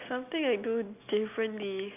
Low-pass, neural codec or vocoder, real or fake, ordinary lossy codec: 3.6 kHz; none; real; none